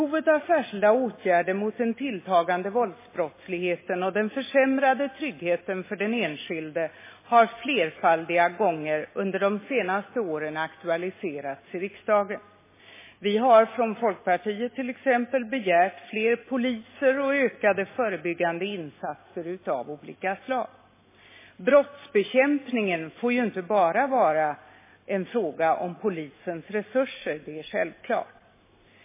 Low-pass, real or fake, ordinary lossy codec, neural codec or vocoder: 3.6 kHz; real; MP3, 16 kbps; none